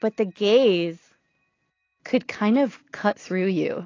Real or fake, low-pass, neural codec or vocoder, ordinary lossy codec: real; 7.2 kHz; none; AAC, 32 kbps